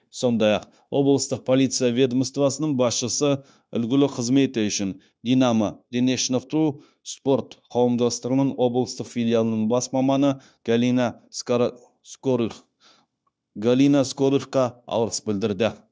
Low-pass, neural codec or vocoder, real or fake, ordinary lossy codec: none; codec, 16 kHz, 0.9 kbps, LongCat-Audio-Codec; fake; none